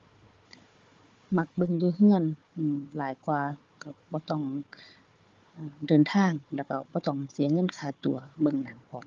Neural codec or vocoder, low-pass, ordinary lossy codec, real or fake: codec, 16 kHz, 4 kbps, FunCodec, trained on Chinese and English, 50 frames a second; 7.2 kHz; Opus, 32 kbps; fake